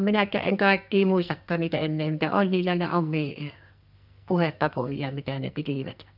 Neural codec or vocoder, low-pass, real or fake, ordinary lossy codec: codec, 44.1 kHz, 2.6 kbps, SNAC; 5.4 kHz; fake; none